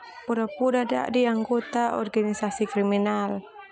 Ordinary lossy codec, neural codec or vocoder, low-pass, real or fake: none; none; none; real